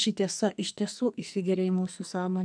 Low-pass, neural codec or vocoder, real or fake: 9.9 kHz; codec, 44.1 kHz, 2.6 kbps, SNAC; fake